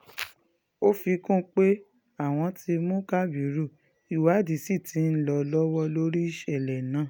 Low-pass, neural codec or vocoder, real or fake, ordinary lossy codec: none; none; real; none